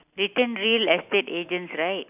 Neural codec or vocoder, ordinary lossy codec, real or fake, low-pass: none; none; real; 3.6 kHz